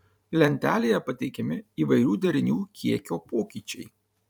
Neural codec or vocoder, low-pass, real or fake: vocoder, 44.1 kHz, 128 mel bands every 512 samples, BigVGAN v2; 19.8 kHz; fake